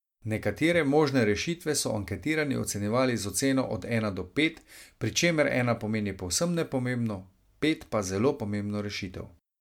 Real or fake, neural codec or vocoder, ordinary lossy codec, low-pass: real; none; MP3, 96 kbps; 19.8 kHz